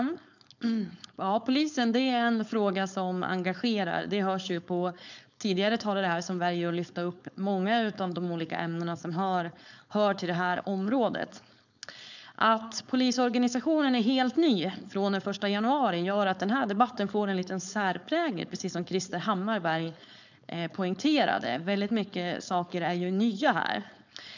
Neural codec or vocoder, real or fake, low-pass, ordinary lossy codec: codec, 16 kHz, 4.8 kbps, FACodec; fake; 7.2 kHz; none